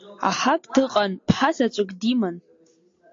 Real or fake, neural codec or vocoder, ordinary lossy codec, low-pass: real; none; AAC, 48 kbps; 7.2 kHz